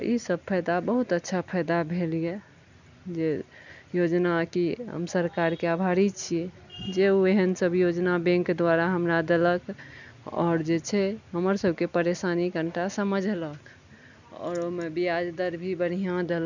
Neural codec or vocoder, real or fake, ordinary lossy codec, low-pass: none; real; none; 7.2 kHz